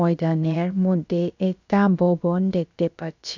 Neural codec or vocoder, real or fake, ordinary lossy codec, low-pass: codec, 16 kHz, about 1 kbps, DyCAST, with the encoder's durations; fake; none; 7.2 kHz